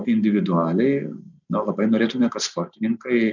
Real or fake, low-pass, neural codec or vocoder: real; 7.2 kHz; none